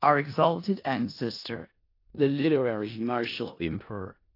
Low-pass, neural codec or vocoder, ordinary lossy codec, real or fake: 5.4 kHz; codec, 16 kHz in and 24 kHz out, 0.4 kbps, LongCat-Audio-Codec, four codebook decoder; AAC, 32 kbps; fake